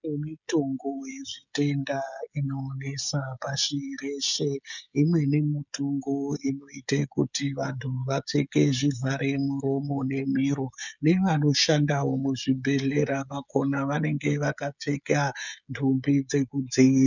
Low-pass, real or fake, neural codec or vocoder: 7.2 kHz; fake; codec, 16 kHz, 16 kbps, FreqCodec, smaller model